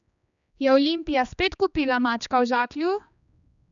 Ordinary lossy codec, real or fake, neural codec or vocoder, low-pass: none; fake; codec, 16 kHz, 2 kbps, X-Codec, HuBERT features, trained on general audio; 7.2 kHz